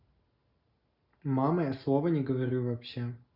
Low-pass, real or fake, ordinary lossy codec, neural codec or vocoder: 5.4 kHz; real; Opus, 64 kbps; none